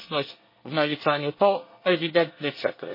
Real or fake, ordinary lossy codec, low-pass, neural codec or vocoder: fake; MP3, 24 kbps; 5.4 kHz; codec, 24 kHz, 1 kbps, SNAC